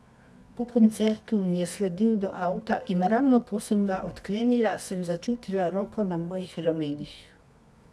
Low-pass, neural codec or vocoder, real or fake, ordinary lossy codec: none; codec, 24 kHz, 0.9 kbps, WavTokenizer, medium music audio release; fake; none